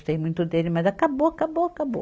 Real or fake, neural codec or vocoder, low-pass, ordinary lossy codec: real; none; none; none